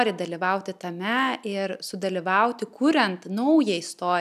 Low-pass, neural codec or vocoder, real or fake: 14.4 kHz; none; real